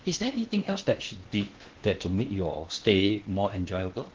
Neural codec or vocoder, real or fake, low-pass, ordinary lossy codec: codec, 16 kHz in and 24 kHz out, 0.8 kbps, FocalCodec, streaming, 65536 codes; fake; 7.2 kHz; Opus, 16 kbps